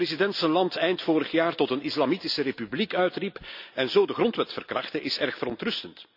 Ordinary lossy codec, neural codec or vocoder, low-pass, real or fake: none; none; 5.4 kHz; real